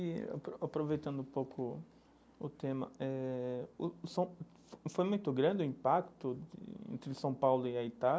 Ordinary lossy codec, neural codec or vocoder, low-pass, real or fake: none; none; none; real